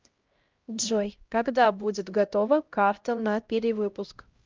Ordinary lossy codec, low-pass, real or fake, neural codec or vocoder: Opus, 24 kbps; 7.2 kHz; fake; codec, 16 kHz, 0.5 kbps, X-Codec, HuBERT features, trained on LibriSpeech